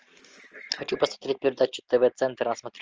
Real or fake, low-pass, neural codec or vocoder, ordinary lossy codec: real; 7.2 kHz; none; Opus, 24 kbps